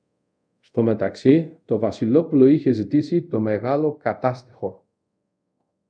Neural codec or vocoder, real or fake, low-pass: codec, 24 kHz, 0.5 kbps, DualCodec; fake; 9.9 kHz